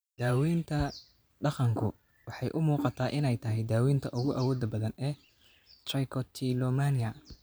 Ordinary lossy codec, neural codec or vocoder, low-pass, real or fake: none; vocoder, 44.1 kHz, 128 mel bands every 512 samples, BigVGAN v2; none; fake